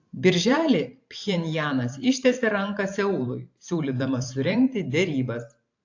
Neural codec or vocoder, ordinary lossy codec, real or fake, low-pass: vocoder, 44.1 kHz, 128 mel bands every 512 samples, BigVGAN v2; AAC, 48 kbps; fake; 7.2 kHz